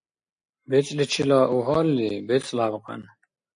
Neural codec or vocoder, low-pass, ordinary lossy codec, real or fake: none; 9.9 kHz; AAC, 64 kbps; real